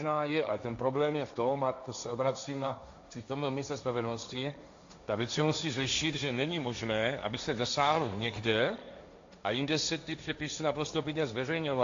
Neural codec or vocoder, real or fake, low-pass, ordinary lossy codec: codec, 16 kHz, 1.1 kbps, Voila-Tokenizer; fake; 7.2 kHz; AAC, 64 kbps